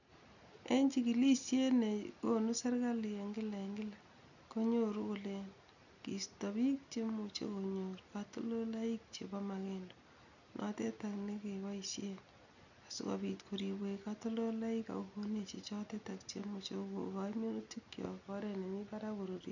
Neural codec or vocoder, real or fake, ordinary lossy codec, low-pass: none; real; none; 7.2 kHz